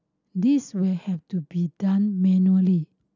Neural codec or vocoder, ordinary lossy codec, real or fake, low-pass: none; none; real; 7.2 kHz